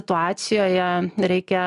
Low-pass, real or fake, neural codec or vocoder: 10.8 kHz; real; none